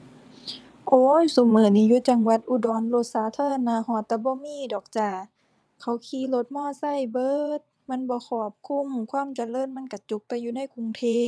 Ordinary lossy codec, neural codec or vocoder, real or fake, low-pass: none; vocoder, 22.05 kHz, 80 mel bands, WaveNeXt; fake; none